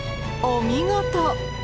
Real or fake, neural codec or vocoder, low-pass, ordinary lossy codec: real; none; none; none